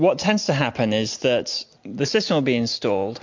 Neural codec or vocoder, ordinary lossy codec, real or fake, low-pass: none; MP3, 48 kbps; real; 7.2 kHz